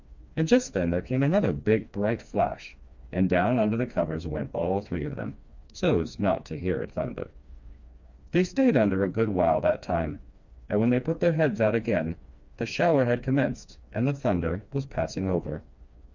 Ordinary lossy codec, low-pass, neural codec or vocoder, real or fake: Opus, 64 kbps; 7.2 kHz; codec, 16 kHz, 2 kbps, FreqCodec, smaller model; fake